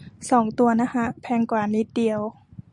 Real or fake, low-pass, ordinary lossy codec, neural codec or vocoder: real; 10.8 kHz; Opus, 64 kbps; none